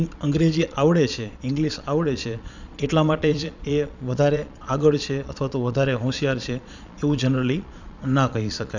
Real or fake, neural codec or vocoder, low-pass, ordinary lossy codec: fake; vocoder, 22.05 kHz, 80 mel bands, Vocos; 7.2 kHz; none